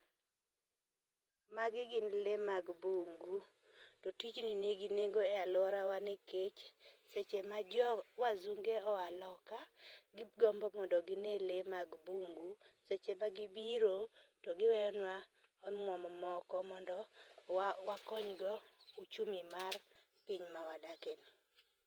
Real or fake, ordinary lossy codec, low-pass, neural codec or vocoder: fake; Opus, 32 kbps; 19.8 kHz; vocoder, 48 kHz, 128 mel bands, Vocos